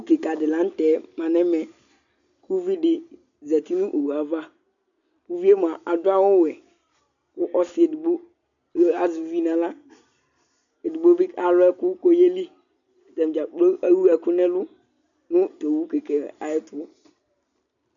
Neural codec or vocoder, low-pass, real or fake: none; 7.2 kHz; real